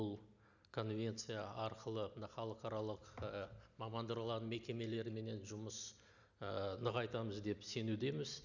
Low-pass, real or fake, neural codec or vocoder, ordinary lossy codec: 7.2 kHz; real; none; none